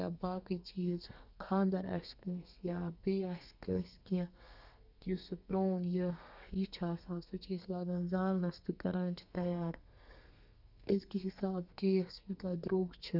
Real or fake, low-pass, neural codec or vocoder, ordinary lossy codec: fake; 5.4 kHz; codec, 32 kHz, 1.9 kbps, SNAC; none